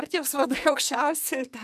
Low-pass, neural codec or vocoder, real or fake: 14.4 kHz; codec, 44.1 kHz, 2.6 kbps, SNAC; fake